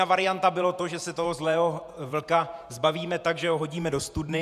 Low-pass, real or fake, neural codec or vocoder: 14.4 kHz; fake; vocoder, 48 kHz, 128 mel bands, Vocos